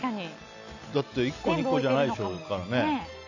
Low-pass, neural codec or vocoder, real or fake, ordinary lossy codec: 7.2 kHz; none; real; none